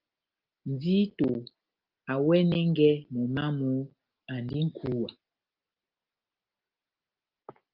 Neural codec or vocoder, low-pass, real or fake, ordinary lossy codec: none; 5.4 kHz; real; Opus, 32 kbps